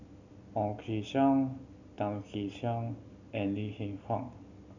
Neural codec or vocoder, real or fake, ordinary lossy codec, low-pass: none; real; none; 7.2 kHz